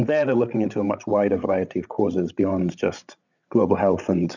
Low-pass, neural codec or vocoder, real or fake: 7.2 kHz; codec, 16 kHz, 16 kbps, FreqCodec, larger model; fake